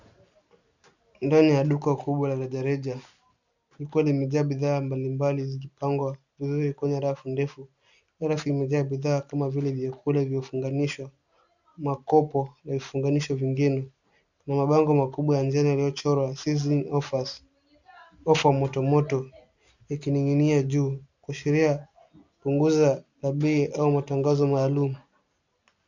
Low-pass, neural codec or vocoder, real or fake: 7.2 kHz; none; real